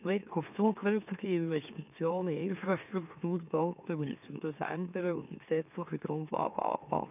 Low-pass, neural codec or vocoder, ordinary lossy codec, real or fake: 3.6 kHz; autoencoder, 44.1 kHz, a latent of 192 numbers a frame, MeloTTS; none; fake